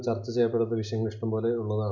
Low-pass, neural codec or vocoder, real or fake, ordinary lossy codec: 7.2 kHz; none; real; none